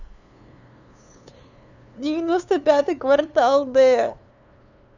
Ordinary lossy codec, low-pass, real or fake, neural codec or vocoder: none; 7.2 kHz; fake; codec, 16 kHz, 2 kbps, FunCodec, trained on LibriTTS, 25 frames a second